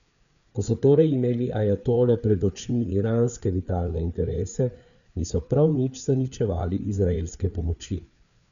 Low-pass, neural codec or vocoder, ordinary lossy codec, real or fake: 7.2 kHz; codec, 16 kHz, 4 kbps, FreqCodec, larger model; none; fake